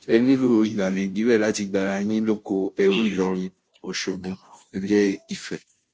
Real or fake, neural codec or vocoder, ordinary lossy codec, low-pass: fake; codec, 16 kHz, 0.5 kbps, FunCodec, trained on Chinese and English, 25 frames a second; none; none